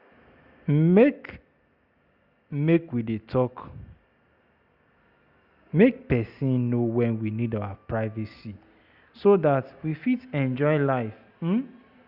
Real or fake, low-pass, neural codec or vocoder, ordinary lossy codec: real; 5.4 kHz; none; Opus, 64 kbps